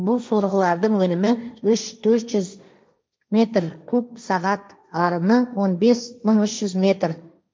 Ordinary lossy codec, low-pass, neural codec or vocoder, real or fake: none; none; codec, 16 kHz, 1.1 kbps, Voila-Tokenizer; fake